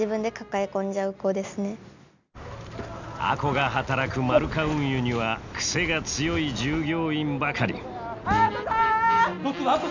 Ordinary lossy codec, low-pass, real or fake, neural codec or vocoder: none; 7.2 kHz; real; none